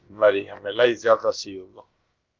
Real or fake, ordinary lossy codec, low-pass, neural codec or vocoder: fake; Opus, 24 kbps; 7.2 kHz; codec, 16 kHz, about 1 kbps, DyCAST, with the encoder's durations